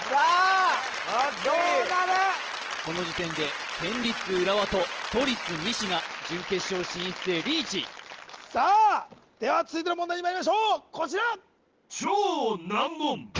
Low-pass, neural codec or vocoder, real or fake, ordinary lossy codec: 7.2 kHz; none; real; Opus, 16 kbps